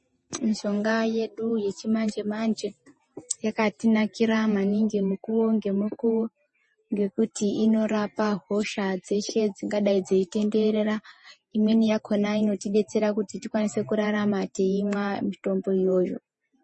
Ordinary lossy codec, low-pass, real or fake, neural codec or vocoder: MP3, 32 kbps; 10.8 kHz; fake; vocoder, 48 kHz, 128 mel bands, Vocos